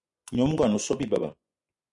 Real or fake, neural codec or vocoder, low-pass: fake; vocoder, 24 kHz, 100 mel bands, Vocos; 10.8 kHz